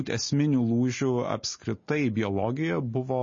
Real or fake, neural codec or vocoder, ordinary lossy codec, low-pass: real; none; MP3, 32 kbps; 7.2 kHz